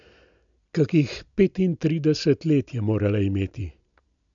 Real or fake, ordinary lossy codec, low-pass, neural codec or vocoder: real; MP3, 64 kbps; 7.2 kHz; none